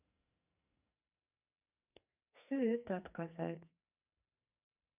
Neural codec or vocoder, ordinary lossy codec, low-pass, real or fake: codec, 44.1 kHz, 2.6 kbps, SNAC; none; 3.6 kHz; fake